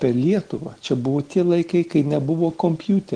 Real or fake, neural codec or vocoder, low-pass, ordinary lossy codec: real; none; 9.9 kHz; Opus, 16 kbps